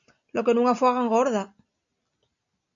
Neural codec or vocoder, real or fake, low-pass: none; real; 7.2 kHz